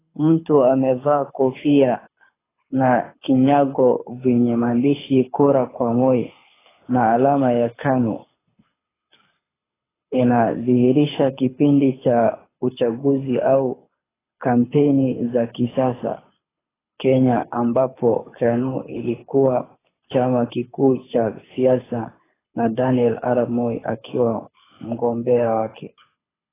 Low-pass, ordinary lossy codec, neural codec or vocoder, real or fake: 3.6 kHz; AAC, 16 kbps; codec, 24 kHz, 6 kbps, HILCodec; fake